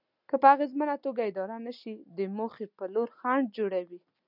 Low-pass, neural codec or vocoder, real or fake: 5.4 kHz; none; real